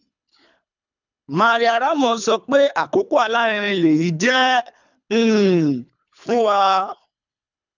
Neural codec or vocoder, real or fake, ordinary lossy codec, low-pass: codec, 24 kHz, 3 kbps, HILCodec; fake; none; 7.2 kHz